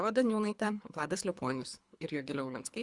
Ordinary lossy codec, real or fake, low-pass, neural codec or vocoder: Opus, 64 kbps; fake; 10.8 kHz; codec, 24 kHz, 3 kbps, HILCodec